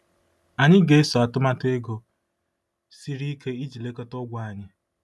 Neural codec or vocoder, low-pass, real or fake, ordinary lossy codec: none; none; real; none